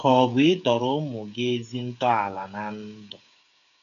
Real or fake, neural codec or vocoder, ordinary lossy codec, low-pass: fake; codec, 16 kHz, 8 kbps, FreqCodec, smaller model; none; 7.2 kHz